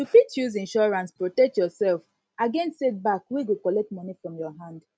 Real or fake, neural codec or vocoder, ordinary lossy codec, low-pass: real; none; none; none